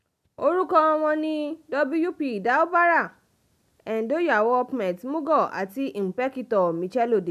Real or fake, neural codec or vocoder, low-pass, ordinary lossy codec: real; none; 14.4 kHz; none